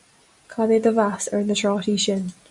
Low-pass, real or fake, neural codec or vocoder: 10.8 kHz; real; none